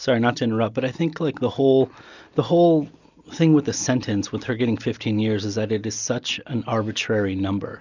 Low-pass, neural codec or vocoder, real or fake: 7.2 kHz; none; real